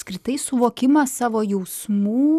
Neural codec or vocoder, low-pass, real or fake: none; 14.4 kHz; real